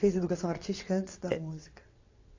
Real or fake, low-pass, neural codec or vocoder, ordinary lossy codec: fake; 7.2 kHz; vocoder, 22.05 kHz, 80 mel bands, WaveNeXt; AAC, 48 kbps